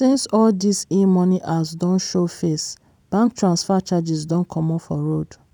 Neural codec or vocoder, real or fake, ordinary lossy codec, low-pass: vocoder, 44.1 kHz, 128 mel bands every 256 samples, BigVGAN v2; fake; none; 19.8 kHz